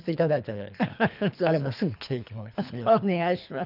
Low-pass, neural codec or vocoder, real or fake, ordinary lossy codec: 5.4 kHz; codec, 24 kHz, 3 kbps, HILCodec; fake; none